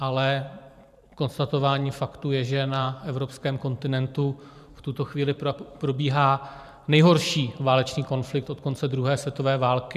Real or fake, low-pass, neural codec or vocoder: fake; 14.4 kHz; vocoder, 44.1 kHz, 128 mel bands every 512 samples, BigVGAN v2